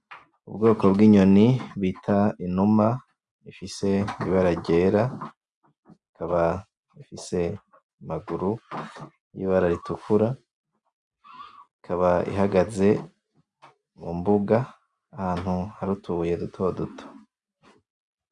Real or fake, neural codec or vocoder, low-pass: real; none; 10.8 kHz